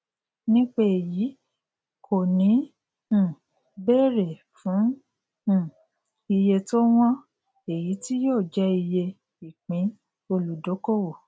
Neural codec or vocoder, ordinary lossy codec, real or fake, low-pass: none; none; real; none